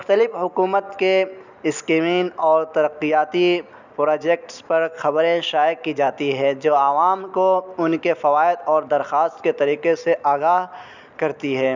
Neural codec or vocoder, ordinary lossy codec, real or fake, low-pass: none; none; real; 7.2 kHz